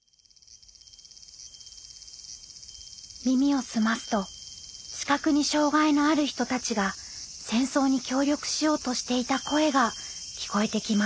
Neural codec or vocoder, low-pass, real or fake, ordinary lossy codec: none; none; real; none